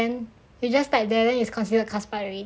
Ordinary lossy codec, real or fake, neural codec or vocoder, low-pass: none; real; none; none